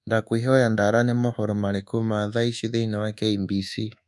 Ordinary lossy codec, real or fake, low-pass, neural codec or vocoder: none; fake; none; codec, 24 kHz, 1.2 kbps, DualCodec